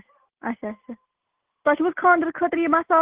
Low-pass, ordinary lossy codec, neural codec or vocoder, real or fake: 3.6 kHz; Opus, 16 kbps; none; real